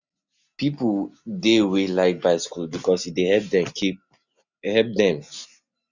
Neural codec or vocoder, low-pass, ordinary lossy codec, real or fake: none; 7.2 kHz; none; real